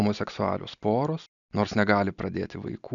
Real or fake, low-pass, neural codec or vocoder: real; 7.2 kHz; none